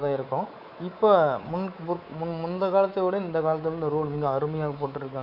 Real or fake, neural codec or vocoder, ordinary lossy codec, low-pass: fake; codec, 24 kHz, 3.1 kbps, DualCodec; none; 5.4 kHz